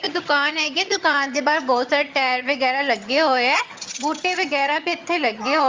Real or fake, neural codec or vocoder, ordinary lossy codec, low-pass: fake; vocoder, 22.05 kHz, 80 mel bands, HiFi-GAN; Opus, 32 kbps; 7.2 kHz